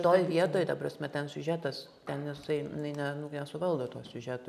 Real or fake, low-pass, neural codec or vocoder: real; 14.4 kHz; none